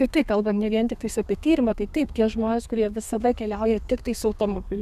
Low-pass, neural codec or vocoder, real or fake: 14.4 kHz; codec, 32 kHz, 1.9 kbps, SNAC; fake